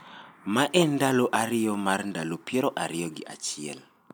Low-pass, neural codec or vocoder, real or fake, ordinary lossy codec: none; none; real; none